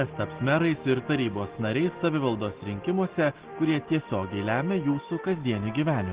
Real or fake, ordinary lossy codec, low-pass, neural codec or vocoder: real; Opus, 16 kbps; 3.6 kHz; none